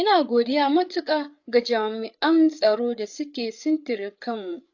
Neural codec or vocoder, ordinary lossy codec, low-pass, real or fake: codec, 16 kHz, 8 kbps, FreqCodec, larger model; AAC, 48 kbps; 7.2 kHz; fake